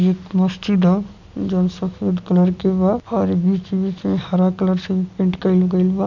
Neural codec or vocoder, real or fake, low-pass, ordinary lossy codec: none; real; 7.2 kHz; none